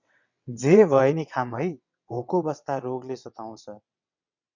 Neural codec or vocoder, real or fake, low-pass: vocoder, 22.05 kHz, 80 mel bands, WaveNeXt; fake; 7.2 kHz